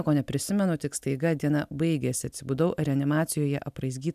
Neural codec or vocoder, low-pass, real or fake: none; 14.4 kHz; real